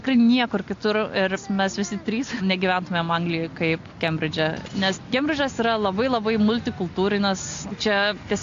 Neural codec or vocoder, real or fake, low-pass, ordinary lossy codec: none; real; 7.2 kHz; AAC, 48 kbps